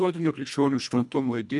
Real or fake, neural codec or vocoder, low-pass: fake; codec, 24 kHz, 1.5 kbps, HILCodec; 10.8 kHz